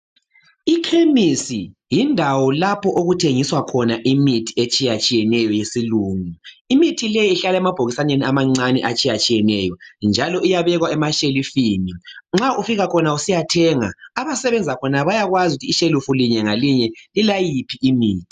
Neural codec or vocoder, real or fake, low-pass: none; real; 14.4 kHz